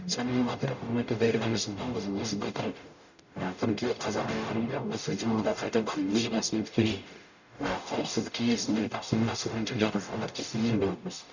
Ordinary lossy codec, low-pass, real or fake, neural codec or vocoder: none; 7.2 kHz; fake; codec, 44.1 kHz, 0.9 kbps, DAC